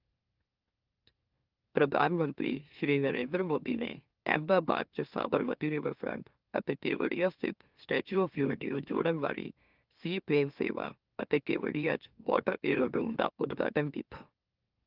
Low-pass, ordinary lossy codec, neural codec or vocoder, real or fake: 5.4 kHz; Opus, 24 kbps; autoencoder, 44.1 kHz, a latent of 192 numbers a frame, MeloTTS; fake